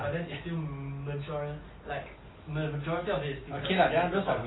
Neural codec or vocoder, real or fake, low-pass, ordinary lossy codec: none; real; 7.2 kHz; AAC, 16 kbps